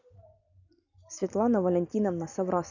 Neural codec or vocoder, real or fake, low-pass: none; real; 7.2 kHz